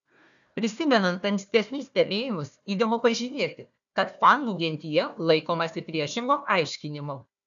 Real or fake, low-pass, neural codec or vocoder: fake; 7.2 kHz; codec, 16 kHz, 1 kbps, FunCodec, trained on Chinese and English, 50 frames a second